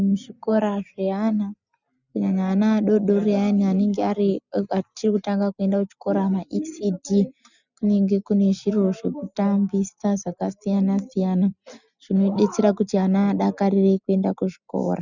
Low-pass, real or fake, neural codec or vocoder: 7.2 kHz; real; none